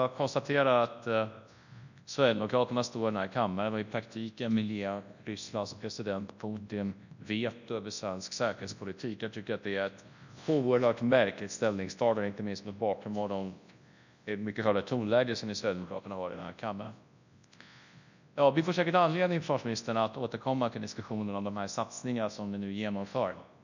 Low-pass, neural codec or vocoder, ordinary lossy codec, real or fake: 7.2 kHz; codec, 24 kHz, 0.9 kbps, WavTokenizer, large speech release; none; fake